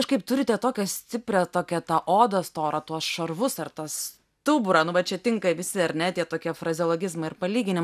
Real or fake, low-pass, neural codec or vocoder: real; 14.4 kHz; none